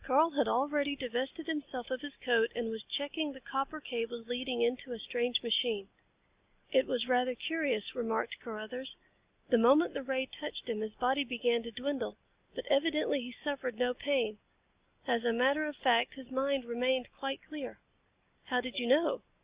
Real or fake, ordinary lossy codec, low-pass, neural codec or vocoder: real; Opus, 64 kbps; 3.6 kHz; none